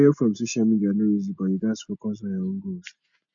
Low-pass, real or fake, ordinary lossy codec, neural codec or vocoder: 7.2 kHz; real; none; none